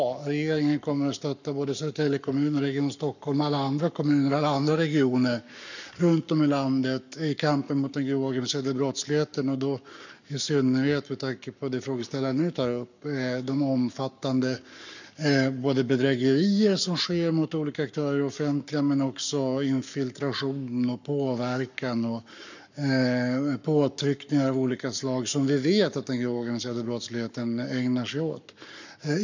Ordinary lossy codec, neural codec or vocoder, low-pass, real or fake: none; codec, 44.1 kHz, 7.8 kbps, Pupu-Codec; 7.2 kHz; fake